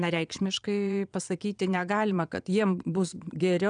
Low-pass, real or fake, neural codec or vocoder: 9.9 kHz; fake; vocoder, 22.05 kHz, 80 mel bands, Vocos